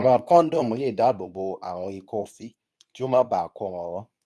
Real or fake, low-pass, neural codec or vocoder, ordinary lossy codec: fake; none; codec, 24 kHz, 0.9 kbps, WavTokenizer, medium speech release version 2; none